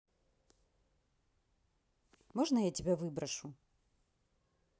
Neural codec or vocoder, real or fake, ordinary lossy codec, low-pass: none; real; none; none